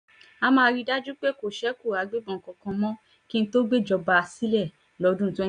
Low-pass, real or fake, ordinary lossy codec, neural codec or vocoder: 9.9 kHz; real; none; none